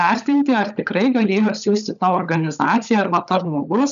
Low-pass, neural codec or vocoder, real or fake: 7.2 kHz; codec, 16 kHz, 16 kbps, FunCodec, trained on LibriTTS, 50 frames a second; fake